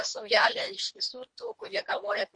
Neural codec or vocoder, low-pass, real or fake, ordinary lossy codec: codec, 24 kHz, 1.5 kbps, HILCodec; 9.9 kHz; fake; MP3, 48 kbps